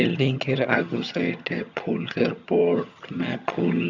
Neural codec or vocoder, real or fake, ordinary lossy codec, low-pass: vocoder, 22.05 kHz, 80 mel bands, HiFi-GAN; fake; none; 7.2 kHz